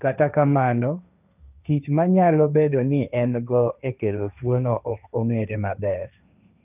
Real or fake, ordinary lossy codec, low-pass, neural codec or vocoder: fake; none; 3.6 kHz; codec, 16 kHz, 1.1 kbps, Voila-Tokenizer